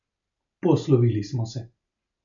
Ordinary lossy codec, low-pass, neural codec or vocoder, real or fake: none; 7.2 kHz; none; real